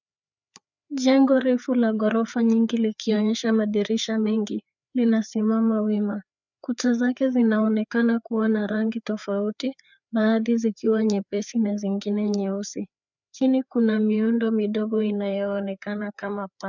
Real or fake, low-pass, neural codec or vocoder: fake; 7.2 kHz; codec, 16 kHz, 4 kbps, FreqCodec, larger model